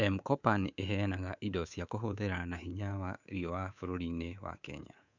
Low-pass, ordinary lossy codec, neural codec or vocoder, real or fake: 7.2 kHz; none; codec, 24 kHz, 3.1 kbps, DualCodec; fake